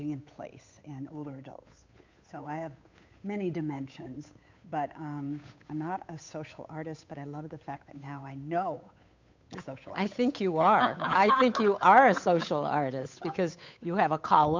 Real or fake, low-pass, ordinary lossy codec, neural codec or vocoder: fake; 7.2 kHz; MP3, 64 kbps; codec, 16 kHz, 8 kbps, FunCodec, trained on Chinese and English, 25 frames a second